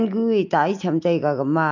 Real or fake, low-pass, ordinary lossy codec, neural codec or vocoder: real; 7.2 kHz; none; none